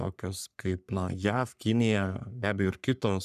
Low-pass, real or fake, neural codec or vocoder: 14.4 kHz; fake; codec, 44.1 kHz, 3.4 kbps, Pupu-Codec